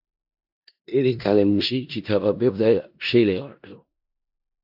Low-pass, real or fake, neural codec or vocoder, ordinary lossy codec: 5.4 kHz; fake; codec, 16 kHz in and 24 kHz out, 0.4 kbps, LongCat-Audio-Codec, four codebook decoder; AAC, 48 kbps